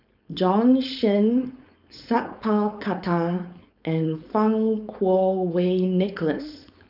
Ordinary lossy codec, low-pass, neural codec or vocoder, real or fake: none; 5.4 kHz; codec, 16 kHz, 4.8 kbps, FACodec; fake